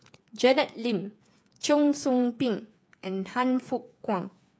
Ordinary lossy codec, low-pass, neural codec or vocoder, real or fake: none; none; codec, 16 kHz, 8 kbps, FreqCodec, smaller model; fake